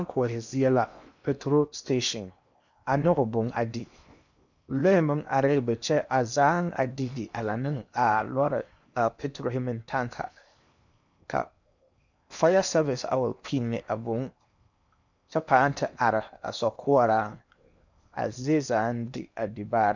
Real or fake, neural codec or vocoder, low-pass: fake; codec, 16 kHz in and 24 kHz out, 0.8 kbps, FocalCodec, streaming, 65536 codes; 7.2 kHz